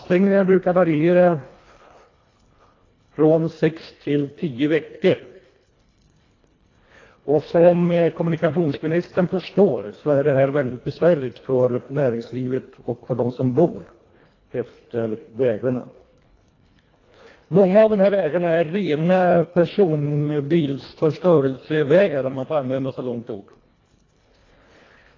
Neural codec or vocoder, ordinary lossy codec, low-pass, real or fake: codec, 24 kHz, 1.5 kbps, HILCodec; AAC, 32 kbps; 7.2 kHz; fake